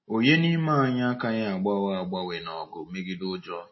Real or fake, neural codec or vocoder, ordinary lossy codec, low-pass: real; none; MP3, 24 kbps; 7.2 kHz